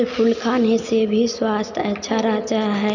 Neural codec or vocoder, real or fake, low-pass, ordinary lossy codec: none; real; 7.2 kHz; none